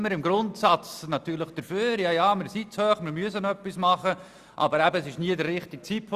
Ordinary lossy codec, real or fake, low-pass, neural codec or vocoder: Opus, 64 kbps; real; 14.4 kHz; none